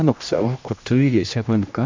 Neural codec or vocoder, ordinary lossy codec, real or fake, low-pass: codec, 16 kHz, 1 kbps, X-Codec, HuBERT features, trained on general audio; MP3, 64 kbps; fake; 7.2 kHz